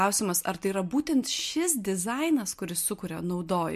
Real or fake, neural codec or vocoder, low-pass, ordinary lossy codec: real; none; 14.4 kHz; MP3, 64 kbps